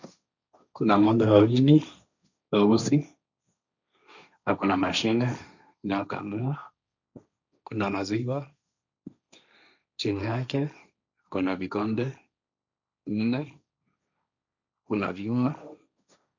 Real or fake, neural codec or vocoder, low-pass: fake; codec, 16 kHz, 1.1 kbps, Voila-Tokenizer; 7.2 kHz